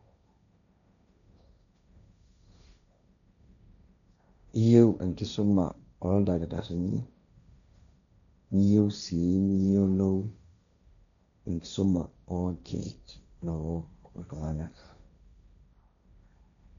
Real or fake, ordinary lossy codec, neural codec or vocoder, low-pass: fake; none; codec, 16 kHz, 1.1 kbps, Voila-Tokenizer; 7.2 kHz